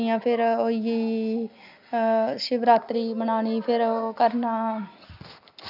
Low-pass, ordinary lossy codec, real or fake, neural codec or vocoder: 5.4 kHz; none; real; none